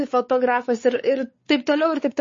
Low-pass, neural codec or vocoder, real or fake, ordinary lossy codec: 7.2 kHz; codec, 16 kHz, 4 kbps, X-Codec, WavLM features, trained on Multilingual LibriSpeech; fake; MP3, 32 kbps